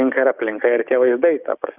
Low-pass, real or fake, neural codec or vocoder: 3.6 kHz; fake; codec, 16 kHz, 6 kbps, DAC